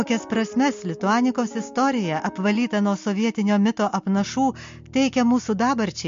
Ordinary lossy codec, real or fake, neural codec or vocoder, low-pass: MP3, 48 kbps; real; none; 7.2 kHz